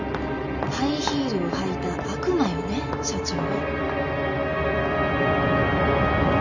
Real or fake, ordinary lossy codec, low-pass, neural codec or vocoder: real; none; 7.2 kHz; none